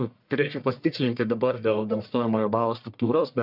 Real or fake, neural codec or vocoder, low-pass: fake; codec, 44.1 kHz, 1.7 kbps, Pupu-Codec; 5.4 kHz